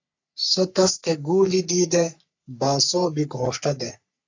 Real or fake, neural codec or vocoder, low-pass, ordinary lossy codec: fake; codec, 44.1 kHz, 3.4 kbps, Pupu-Codec; 7.2 kHz; AAC, 48 kbps